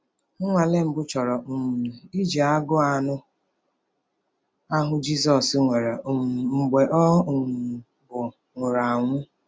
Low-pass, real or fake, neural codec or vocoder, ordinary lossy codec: none; real; none; none